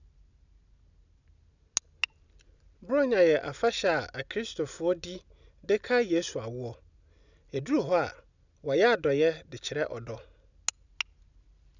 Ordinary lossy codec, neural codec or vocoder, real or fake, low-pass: none; none; real; 7.2 kHz